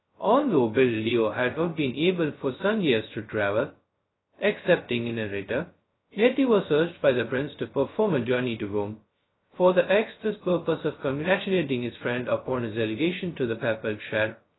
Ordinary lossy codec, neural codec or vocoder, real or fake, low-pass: AAC, 16 kbps; codec, 16 kHz, 0.2 kbps, FocalCodec; fake; 7.2 kHz